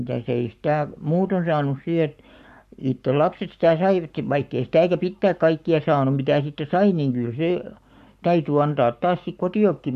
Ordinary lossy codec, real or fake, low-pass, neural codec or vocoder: none; fake; 14.4 kHz; codec, 44.1 kHz, 7.8 kbps, Pupu-Codec